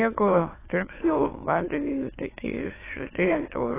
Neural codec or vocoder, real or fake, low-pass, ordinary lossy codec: autoencoder, 22.05 kHz, a latent of 192 numbers a frame, VITS, trained on many speakers; fake; 3.6 kHz; AAC, 16 kbps